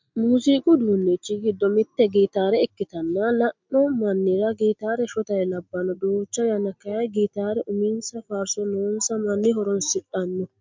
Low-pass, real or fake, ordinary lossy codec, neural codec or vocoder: 7.2 kHz; real; MP3, 64 kbps; none